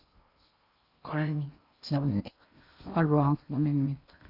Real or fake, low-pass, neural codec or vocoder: fake; 5.4 kHz; codec, 16 kHz in and 24 kHz out, 0.6 kbps, FocalCodec, streaming, 2048 codes